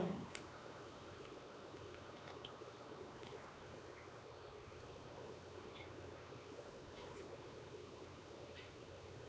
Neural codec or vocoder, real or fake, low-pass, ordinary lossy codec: codec, 16 kHz, 2 kbps, X-Codec, WavLM features, trained on Multilingual LibriSpeech; fake; none; none